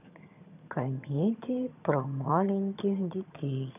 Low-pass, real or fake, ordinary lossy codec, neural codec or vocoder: 3.6 kHz; fake; none; vocoder, 22.05 kHz, 80 mel bands, HiFi-GAN